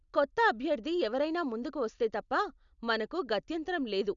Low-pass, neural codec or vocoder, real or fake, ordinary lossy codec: 7.2 kHz; none; real; none